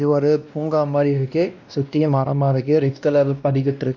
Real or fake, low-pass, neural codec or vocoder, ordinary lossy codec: fake; 7.2 kHz; codec, 16 kHz, 1 kbps, X-Codec, WavLM features, trained on Multilingual LibriSpeech; none